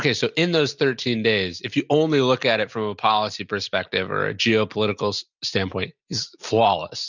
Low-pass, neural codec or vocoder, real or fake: 7.2 kHz; none; real